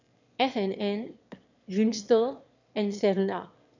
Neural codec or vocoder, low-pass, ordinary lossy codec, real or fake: autoencoder, 22.05 kHz, a latent of 192 numbers a frame, VITS, trained on one speaker; 7.2 kHz; none; fake